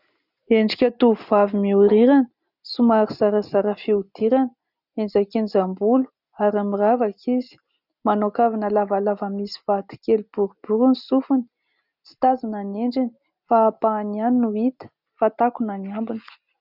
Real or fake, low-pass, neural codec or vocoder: real; 5.4 kHz; none